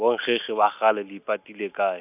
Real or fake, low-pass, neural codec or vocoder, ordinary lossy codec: real; 3.6 kHz; none; none